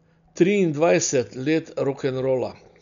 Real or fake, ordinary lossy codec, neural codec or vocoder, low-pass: real; none; none; 7.2 kHz